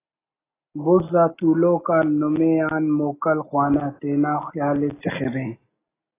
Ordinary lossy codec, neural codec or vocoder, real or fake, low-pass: AAC, 16 kbps; none; real; 3.6 kHz